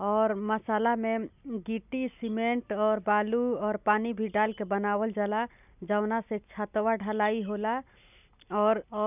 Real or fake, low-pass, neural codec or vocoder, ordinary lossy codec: real; 3.6 kHz; none; none